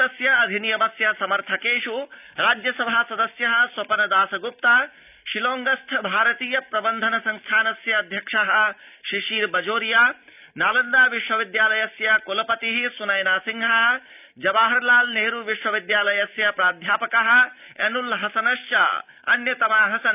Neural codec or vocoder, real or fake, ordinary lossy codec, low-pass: none; real; none; 3.6 kHz